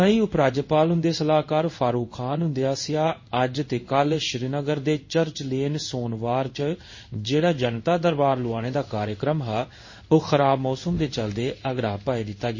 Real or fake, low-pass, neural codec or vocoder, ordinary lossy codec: fake; 7.2 kHz; codec, 16 kHz in and 24 kHz out, 1 kbps, XY-Tokenizer; MP3, 32 kbps